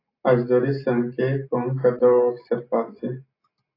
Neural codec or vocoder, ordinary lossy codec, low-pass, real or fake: none; AAC, 24 kbps; 5.4 kHz; real